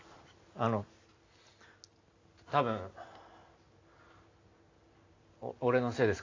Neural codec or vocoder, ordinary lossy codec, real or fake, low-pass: none; AAC, 32 kbps; real; 7.2 kHz